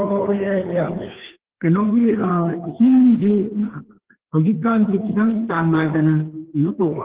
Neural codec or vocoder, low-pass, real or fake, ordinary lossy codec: codec, 16 kHz, 2 kbps, FreqCodec, larger model; 3.6 kHz; fake; Opus, 16 kbps